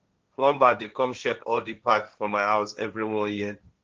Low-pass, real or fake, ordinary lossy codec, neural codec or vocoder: 7.2 kHz; fake; Opus, 24 kbps; codec, 16 kHz, 1.1 kbps, Voila-Tokenizer